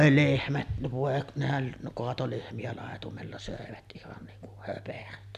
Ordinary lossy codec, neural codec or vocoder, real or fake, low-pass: MP3, 96 kbps; none; real; 14.4 kHz